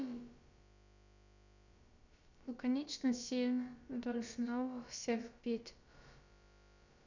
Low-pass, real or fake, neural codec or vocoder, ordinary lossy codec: 7.2 kHz; fake; codec, 16 kHz, about 1 kbps, DyCAST, with the encoder's durations; none